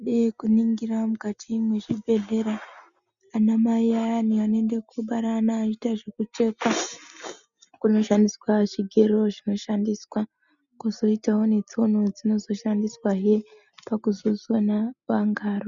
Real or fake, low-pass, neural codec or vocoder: real; 7.2 kHz; none